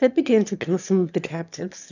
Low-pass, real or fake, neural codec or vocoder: 7.2 kHz; fake; autoencoder, 22.05 kHz, a latent of 192 numbers a frame, VITS, trained on one speaker